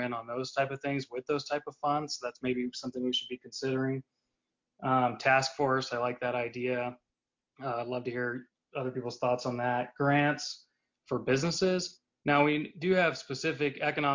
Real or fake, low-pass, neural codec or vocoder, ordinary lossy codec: real; 7.2 kHz; none; MP3, 64 kbps